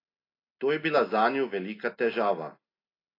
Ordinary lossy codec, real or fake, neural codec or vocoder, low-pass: AAC, 24 kbps; real; none; 5.4 kHz